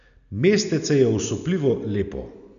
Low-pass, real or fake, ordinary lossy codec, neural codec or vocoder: 7.2 kHz; real; none; none